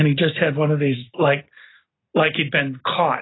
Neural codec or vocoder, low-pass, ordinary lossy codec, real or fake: none; 7.2 kHz; AAC, 16 kbps; real